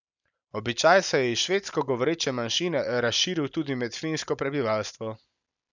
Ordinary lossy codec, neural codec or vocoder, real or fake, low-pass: none; none; real; 7.2 kHz